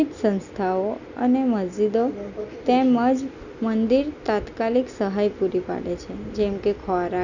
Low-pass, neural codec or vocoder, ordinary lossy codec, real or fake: 7.2 kHz; none; none; real